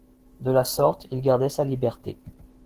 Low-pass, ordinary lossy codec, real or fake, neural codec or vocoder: 14.4 kHz; Opus, 16 kbps; fake; autoencoder, 48 kHz, 128 numbers a frame, DAC-VAE, trained on Japanese speech